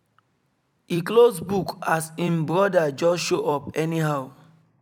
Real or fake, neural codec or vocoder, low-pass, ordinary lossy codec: fake; vocoder, 44.1 kHz, 128 mel bands every 256 samples, BigVGAN v2; 19.8 kHz; none